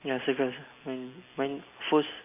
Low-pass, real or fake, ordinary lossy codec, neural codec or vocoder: 3.6 kHz; real; MP3, 24 kbps; none